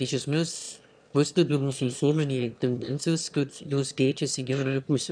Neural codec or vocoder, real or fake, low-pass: autoencoder, 22.05 kHz, a latent of 192 numbers a frame, VITS, trained on one speaker; fake; 9.9 kHz